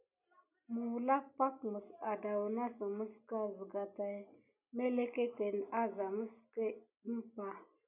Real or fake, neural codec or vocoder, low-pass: real; none; 3.6 kHz